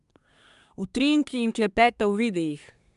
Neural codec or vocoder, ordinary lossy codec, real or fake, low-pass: codec, 24 kHz, 1 kbps, SNAC; MP3, 96 kbps; fake; 10.8 kHz